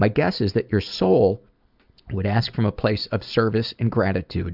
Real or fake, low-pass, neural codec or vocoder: real; 5.4 kHz; none